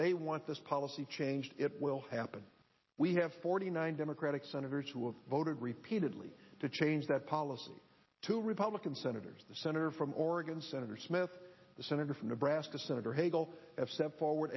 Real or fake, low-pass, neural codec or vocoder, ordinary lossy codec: real; 7.2 kHz; none; MP3, 24 kbps